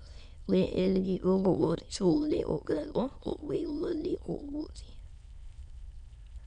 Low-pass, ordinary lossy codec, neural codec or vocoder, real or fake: 9.9 kHz; none; autoencoder, 22.05 kHz, a latent of 192 numbers a frame, VITS, trained on many speakers; fake